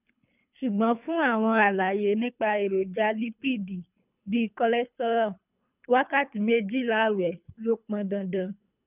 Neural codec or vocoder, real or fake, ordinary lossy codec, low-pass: codec, 24 kHz, 3 kbps, HILCodec; fake; none; 3.6 kHz